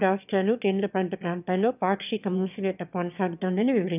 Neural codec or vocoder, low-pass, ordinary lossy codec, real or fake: autoencoder, 22.05 kHz, a latent of 192 numbers a frame, VITS, trained on one speaker; 3.6 kHz; none; fake